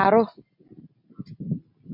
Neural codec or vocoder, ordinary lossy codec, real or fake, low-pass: none; MP3, 32 kbps; real; 5.4 kHz